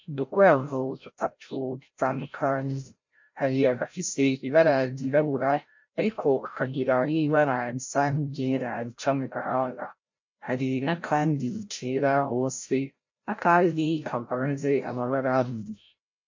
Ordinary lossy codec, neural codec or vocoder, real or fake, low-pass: MP3, 48 kbps; codec, 16 kHz, 0.5 kbps, FreqCodec, larger model; fake; 7.2 kHz